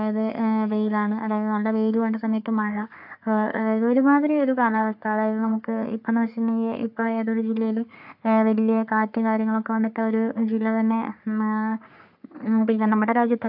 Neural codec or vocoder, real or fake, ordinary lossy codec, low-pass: codec, 44.1 kHz, 3.4 kbps, Pupu-Codec; fake; AAC, 48 kbps; 5.4 kHz